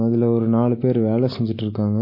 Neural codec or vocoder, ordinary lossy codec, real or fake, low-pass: none; MP3, 24 kbps; real; 5.4 kHz